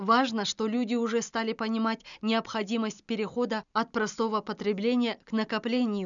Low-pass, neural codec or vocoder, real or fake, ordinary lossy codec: 7.2 kHz; none; real; none